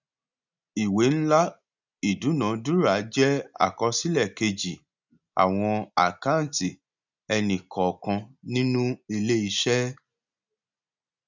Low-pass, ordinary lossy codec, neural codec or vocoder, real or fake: 7.2 kHz; none; none; real